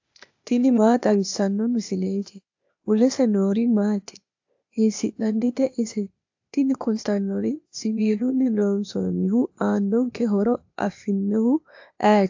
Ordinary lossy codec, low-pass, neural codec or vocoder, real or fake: AAC, 48 kbps; 7.2 kHz; codec, 16 kHz, 0.8 kbps, ZipCodec; fake